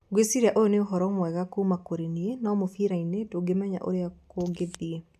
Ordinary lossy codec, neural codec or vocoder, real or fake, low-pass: none; none; real; 14.4 kHz